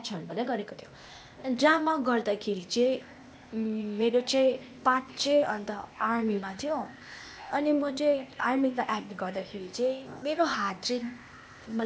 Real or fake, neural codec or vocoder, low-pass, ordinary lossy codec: fake; codec, 16 kHz, 0.8 kbps, ZipCodec; none; none